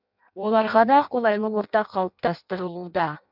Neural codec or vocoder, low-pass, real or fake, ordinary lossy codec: codec, 16 kHz in and 24 kHz out, 0.6 kbps, FireRedTTS-2 codec; 5.4 kHz; fake; none